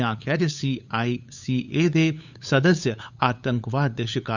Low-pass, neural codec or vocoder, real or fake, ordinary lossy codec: 7.2 kHz; codec, 16 kHz, 8 kbps, FunCodec, trained on LibriTTS, 25 frames a second; fake; none